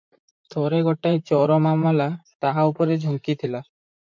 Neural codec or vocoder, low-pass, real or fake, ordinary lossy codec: vocoder, 44.1 kHz, 128 mel bands, Pupu-Vocoder; 7.2 kHz; fake; MP3, 64 kbps